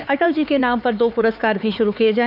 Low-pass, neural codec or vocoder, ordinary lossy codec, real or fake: 5.4 kHz; codec, 16 kHz, 4 kbps, X-Codec, HuBERT features, trained on LibriSpeech; none; fake